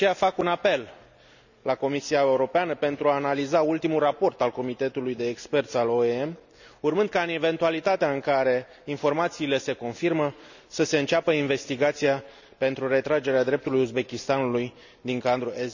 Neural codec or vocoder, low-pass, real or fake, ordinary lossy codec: none; 7.2 kHz; real; none